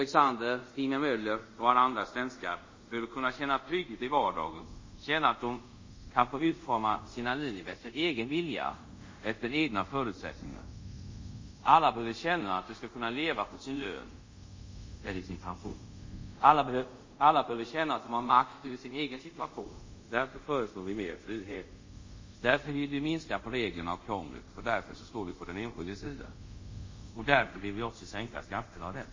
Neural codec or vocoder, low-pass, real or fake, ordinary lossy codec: codec, 24 kHz, 0.5 kbps, DualCodec; 7.2 kHz; fake; MP3, 32 kbps